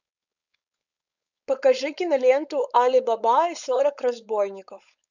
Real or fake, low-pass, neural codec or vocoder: fake; 7.2 kHz; codec, 16 kHz, 4.8 kbps, FACodec